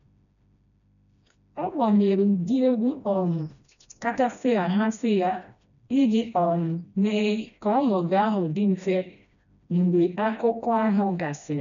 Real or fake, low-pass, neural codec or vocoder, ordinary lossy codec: fake; 7.2 kHz; codec, 16 kHz, 1 kbps, FreqCodec, smaller model; none